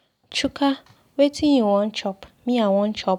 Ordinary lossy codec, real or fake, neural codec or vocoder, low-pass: none; real; none; 19.8 kHz